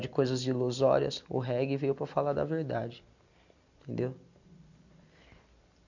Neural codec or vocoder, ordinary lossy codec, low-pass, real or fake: none; none; 7.2 kHz; real